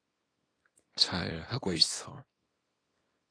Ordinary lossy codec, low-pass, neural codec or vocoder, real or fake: AAC, 32 kbps; 9.9 kHz; codec, 24 kHz, 0.9 kbps, WavTokenizer, small release; fake